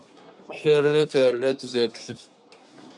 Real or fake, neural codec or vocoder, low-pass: fake; codec, 24 kHz, 1 kbps, SNAC; 10.8 kHz